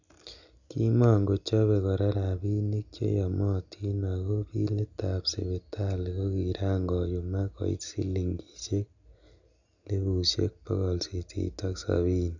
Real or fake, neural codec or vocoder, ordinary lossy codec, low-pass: real; none; none; 7.2 kHz